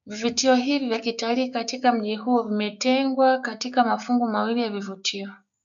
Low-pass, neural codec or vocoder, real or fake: 7.2 kHz; codec, 16 kHz, 6 kbps, DAC; fake